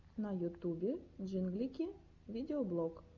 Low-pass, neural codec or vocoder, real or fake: 7.2 kHz; none; real